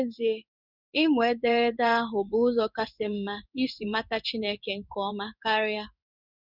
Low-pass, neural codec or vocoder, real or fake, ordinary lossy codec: 5.4 kHz; codec, 16 kHz in and 24 kHz out, 1 kbps, XY-Tokenizer; fake; none